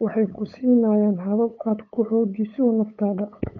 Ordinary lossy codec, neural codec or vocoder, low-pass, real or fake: none; codec, 16 kHz, 8 kbps, FunCodec, trained on LibriTTS, 25 frames a second; 7.2 kHz; fake